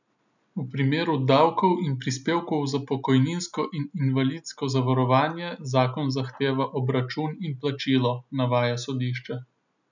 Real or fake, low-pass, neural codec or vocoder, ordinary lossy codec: real; 7.2 kHz; none; none